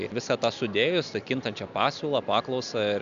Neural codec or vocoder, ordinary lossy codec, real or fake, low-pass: none; AAC, 96 kbps; real; 7.2 kHz